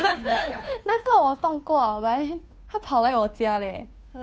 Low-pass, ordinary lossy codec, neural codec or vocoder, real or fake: none; none; codec, 16 kHz, 2 kbps, FunCodec, trained on Chinese and English, 25 frames a second; fake